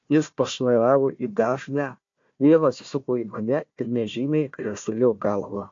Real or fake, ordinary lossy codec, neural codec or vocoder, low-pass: fake; AAC, 48 kbps; codec, 16 kHz, 1 kbps, FunCodec, trained on Chinese and English, 50 frames a second; 7.2 kHz